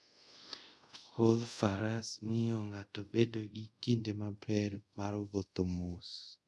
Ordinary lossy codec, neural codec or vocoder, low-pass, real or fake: none; codec, 24 kHz, 0.5 kbps, DualCodec; none; fake